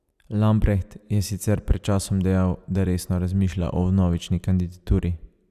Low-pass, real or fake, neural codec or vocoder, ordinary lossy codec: 14.4 kHz; real; none; none